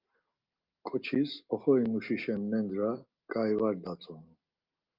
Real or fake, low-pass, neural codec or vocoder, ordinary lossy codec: real; 5.4 kHz; none; Opus, 32 kbps